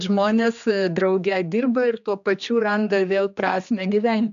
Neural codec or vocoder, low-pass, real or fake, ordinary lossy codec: codec, 16 kHz, 2 kbps, X-Codec, HuBERT features, trained on general audio; 7.2 kHz; fake; MP3, 96 kbps